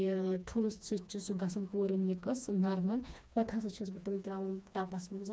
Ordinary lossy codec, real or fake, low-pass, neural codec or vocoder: none; fake; none; codec, 16 kHz, 2 kbps, FreqCodec, smaller model